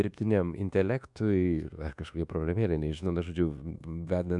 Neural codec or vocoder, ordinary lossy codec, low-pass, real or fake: codec, 24 kHz, 1.2 kbps, DualCodec; MP3, 96 kbps; 10.8 kHz; fake